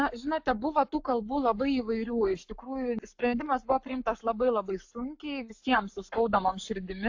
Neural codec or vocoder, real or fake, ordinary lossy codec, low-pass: codec, 44.1 kHz, 7.8 kbps, Pupu-Codec; fake; AAC, 48 kbps; 7.2 kHz